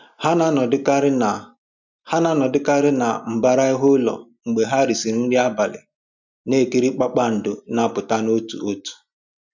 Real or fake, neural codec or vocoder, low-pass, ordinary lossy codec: real; none; 7.2 kHz; none